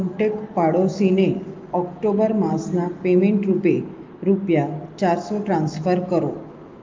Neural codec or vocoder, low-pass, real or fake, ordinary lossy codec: none; 7.2 kHz; real; Opus, 24 kbps